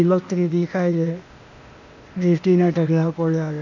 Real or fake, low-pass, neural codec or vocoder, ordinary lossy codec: fake; 7.2 kHz; codec, 16 kHz, 0.8 kbps, ZipCodec; none